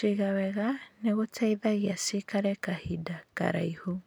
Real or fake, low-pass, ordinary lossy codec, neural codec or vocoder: real; none; none; none